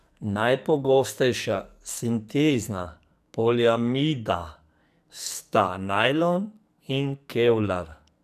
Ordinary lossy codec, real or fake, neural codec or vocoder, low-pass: none; fake; codec, 44.1 kHz, 2.6 kbps, SNAC; 14.4 kHz